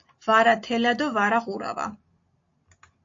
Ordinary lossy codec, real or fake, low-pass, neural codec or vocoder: AAC, 48 kbps; real; 7.2 kHz; none